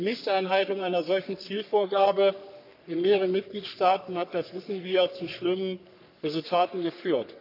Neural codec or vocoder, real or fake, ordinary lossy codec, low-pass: codec, 44.1 kHz, 3.4 kbps, Pupu-Codec; fake; none; 5.4 kHz